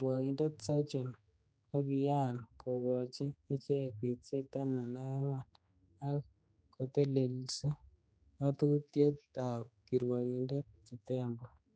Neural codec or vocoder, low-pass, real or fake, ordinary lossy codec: codec, 16 kHz, 2 kbps, X-Codec, HuBERT features, trained on general audio; none; fake; none